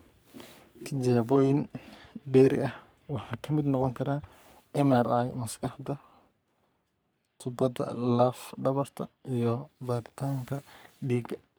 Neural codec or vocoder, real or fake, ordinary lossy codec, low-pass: codec, 44.1 kHz, 3.4 kbps, Pupu-Codec; fake; none; none